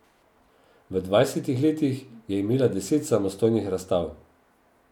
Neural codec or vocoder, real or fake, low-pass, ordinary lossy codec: none; real; 19.8 kHz; none